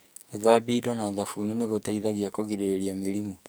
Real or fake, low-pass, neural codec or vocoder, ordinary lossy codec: fake; none; codec, 44.1 kHz, 2.6 kbps, SNAC; none